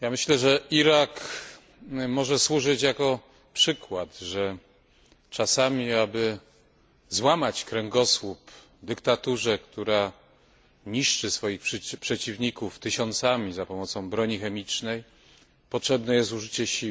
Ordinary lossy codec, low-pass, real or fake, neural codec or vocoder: none; none; real; none